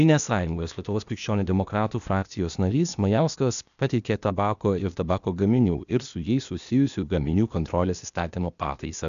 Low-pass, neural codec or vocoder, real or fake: 7.2 kHz; codec, 16 kHz, 0.8 kbps, ZipCodec; fake